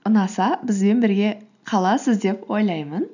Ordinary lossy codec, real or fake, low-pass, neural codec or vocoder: none; real; 7.2 kHz; none